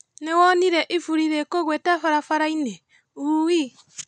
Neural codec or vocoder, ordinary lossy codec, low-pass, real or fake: none; none; none; real